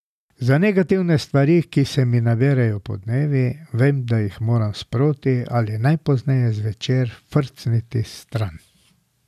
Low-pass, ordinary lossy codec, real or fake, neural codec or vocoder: 14.4 kHz; none; real; none